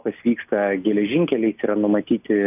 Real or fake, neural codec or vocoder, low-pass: real; none; 3.6 kHz